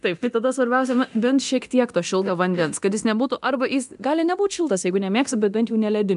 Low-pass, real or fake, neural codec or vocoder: 10.8 kHz; fake; codec, 24 kHz, 0.9 kbps, DualCodec